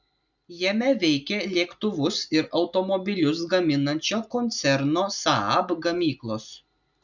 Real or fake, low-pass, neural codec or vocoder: real; 7.2 kHz; none